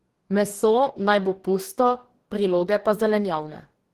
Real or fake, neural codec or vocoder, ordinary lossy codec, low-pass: fake; codec, 44.1 kHz, 2.6 kbps, DAC; Opus, 16 kbps; 14.4 kHz